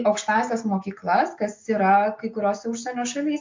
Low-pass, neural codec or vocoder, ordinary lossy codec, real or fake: 7.2 kHz; none; MP3, 48 kbps; real